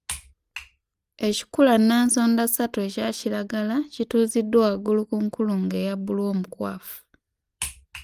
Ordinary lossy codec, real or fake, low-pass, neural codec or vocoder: Opus, 32 kbps; real; 14.4 kHz; none